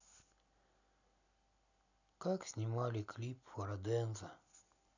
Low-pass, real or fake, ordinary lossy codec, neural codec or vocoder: 7.2 kHz; real; none; none